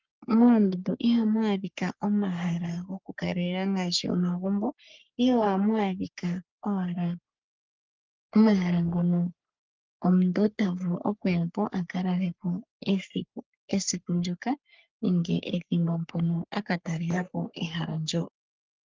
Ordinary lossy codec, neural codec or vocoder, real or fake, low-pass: Opus, 24 kbps; codec, 44.1 kHz, 3.4 kbps, Pupu-Codec; fake; 7.2 kHz